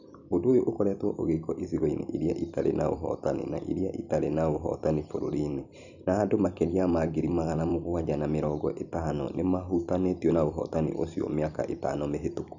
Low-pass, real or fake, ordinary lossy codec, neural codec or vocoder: 7.2 kHz; fake; none; vocoder, 44.1 kHz, 128 mel bands every 256 samples, BigVGAN v2